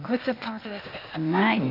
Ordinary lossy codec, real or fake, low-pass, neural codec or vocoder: none; fake; 5.4 kHz; codec, 16 kHz, 0.8 kbps, ZipCodec